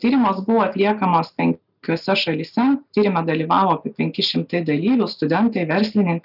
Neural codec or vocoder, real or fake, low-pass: none; real; 5.4 kHz